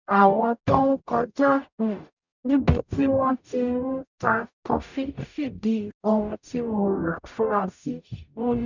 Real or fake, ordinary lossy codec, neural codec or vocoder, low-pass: fake; none; codec, 44.1 kHz, 0.9 kbps, DAC; 7.2 kHz